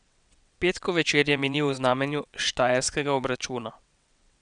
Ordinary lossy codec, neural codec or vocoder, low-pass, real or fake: none; vocoder, 22.05 kHz, 80 mel bands, WaveNeXt; 9.9 kHz; fake